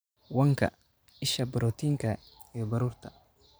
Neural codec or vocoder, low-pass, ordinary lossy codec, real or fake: vocoder, 44.1 kHz, 128 mel bands every 512 samples, BigVGAN v2; none; none; fake